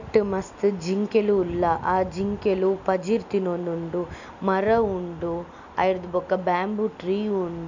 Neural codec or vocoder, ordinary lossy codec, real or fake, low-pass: none; none; real; 7.2 kHz